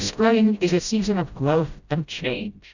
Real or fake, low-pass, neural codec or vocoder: fake; 7.2 kHz; codec, 16 kHz, 0.5 kbps, FreqCodec, smaller model